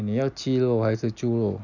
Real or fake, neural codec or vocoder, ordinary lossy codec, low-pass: real; none; none; 7.2 kHz